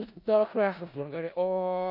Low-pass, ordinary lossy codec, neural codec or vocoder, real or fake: 5.4 kHz; AAC, 48 kbps; codec, 16 kHz in and 24 kHz out, 0.4 kbps, LongCat-Audio-Codec, four codebook decoder; fake